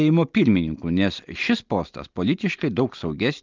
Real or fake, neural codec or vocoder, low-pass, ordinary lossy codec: real; none; 7.2 kHz; Opus, 32 kbps